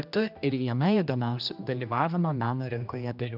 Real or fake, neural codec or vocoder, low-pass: fake; codec, 16 kHz, 1 kbps, X-Codec, HuBERT features, trained on general audio; 5.4 kHz